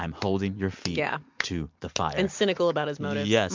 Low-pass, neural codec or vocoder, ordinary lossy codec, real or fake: 7.2 kHz; none; MP3, 64 kbps; real